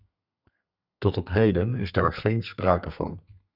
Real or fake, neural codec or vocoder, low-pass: fake; codec, 32 kHz, 1.9 kbps, SNAC; 5.4 kHz